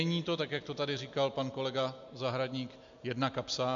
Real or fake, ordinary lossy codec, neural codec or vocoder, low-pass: real; AAC, 64 kbps; none; 7.2 kHz